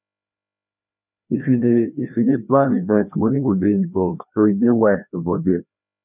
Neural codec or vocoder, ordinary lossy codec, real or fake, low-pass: codec, 16 kHz, 1 kbps, FreqCodec, larger model; none; fake; 3.6 kHz